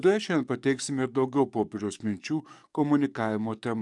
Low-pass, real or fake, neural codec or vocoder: 10.8 kHz; fake; codec, 44.1 kHz, 7.8 kbps, DAC